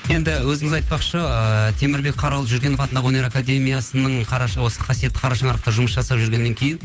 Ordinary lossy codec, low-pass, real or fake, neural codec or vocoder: none; none; fake; codec, 16 kHz, 8 kbps, FunCodec, trained on Chinese and English, 25 frames a second